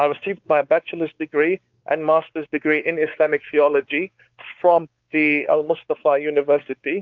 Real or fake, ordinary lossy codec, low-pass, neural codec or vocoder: fake; Opus, 32 kbps; 7.2 kHz; codec, 16 kHz, 4 kbps, X-Codec, WavLM features, trained on Multilingual LibriSpeech